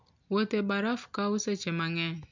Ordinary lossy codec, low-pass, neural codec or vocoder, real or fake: MP3, 64 kbps; 7.2 kHz; none; real